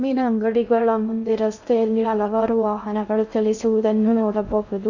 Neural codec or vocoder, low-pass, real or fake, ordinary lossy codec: codec, 16 kHz in and 24 kHz out, 0.6 kbps, FocalCodec, streaming, 2048 codes; 7.2 kHz; fake; none